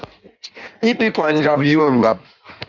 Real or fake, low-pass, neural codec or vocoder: fake; 7.2 kHz; codec, 16 kHz in and 24 kHz out, 1.1 kbps, FireRedTTS-2 codec